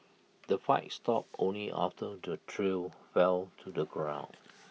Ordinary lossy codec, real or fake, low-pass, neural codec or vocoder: none; real; none; none